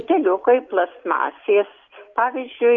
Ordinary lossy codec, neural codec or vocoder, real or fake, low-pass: AAC, 64 kbps; none; real; 7.2 kHz